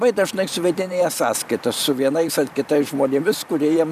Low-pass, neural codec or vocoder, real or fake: 14.4 kHz; vocoder, 44.1 kHz, 128 mel bands every 512 samples, BigVGAN v2; fake